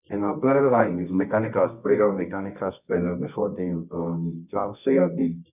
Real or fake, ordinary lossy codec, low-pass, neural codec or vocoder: fake; none; 3.6 kHz; codec, 24 kHz, 0.9 kbps, WavTokenizer, medium music audio release